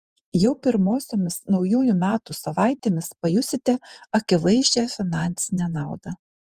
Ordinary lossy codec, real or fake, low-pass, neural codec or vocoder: Opus, 32 kbps; fake; 14.4 kHz; vocoder, 48 kHz, 128 mel bands, Vocos